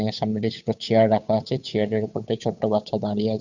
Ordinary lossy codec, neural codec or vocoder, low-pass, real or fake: none; codec, 16 kHz, 8 kbps, FunCodec, trained on Chinese and English, 25 frames a second; 7.2 kHz; fake